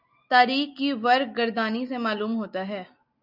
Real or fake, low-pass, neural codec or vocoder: real; 5.4 kHz; none